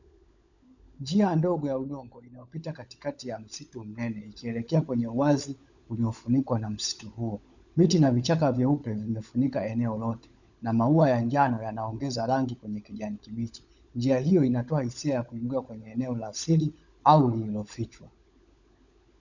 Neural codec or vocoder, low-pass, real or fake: codec, 16 kHz, 16 kbps, FunCodec, trained on LibriTTS, 50 frames a second; 7.2 kHz; fake